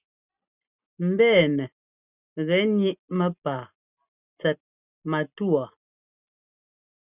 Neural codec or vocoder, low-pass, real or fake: none; 3.6 kHz; real